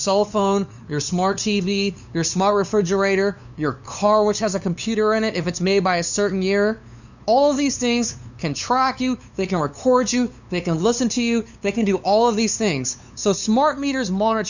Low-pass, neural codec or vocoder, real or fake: 7.2 kHz; codec, 16 kHz, 2 kbps, FunCodec, trained on LibriTTS, 25 frames a second; fake